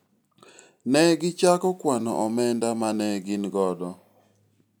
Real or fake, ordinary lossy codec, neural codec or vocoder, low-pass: real; none; none; none